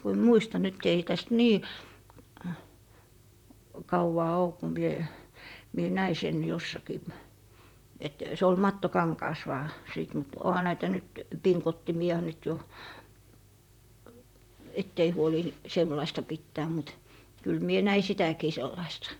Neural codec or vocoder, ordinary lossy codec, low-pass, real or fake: vocoder, 44.1 kHz, 128 mel bands, Pupu-Vocoder; none; 19.8 kHz; fake